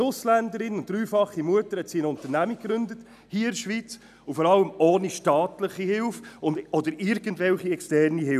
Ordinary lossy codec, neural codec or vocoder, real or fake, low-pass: none; none; real; 14.4 kHz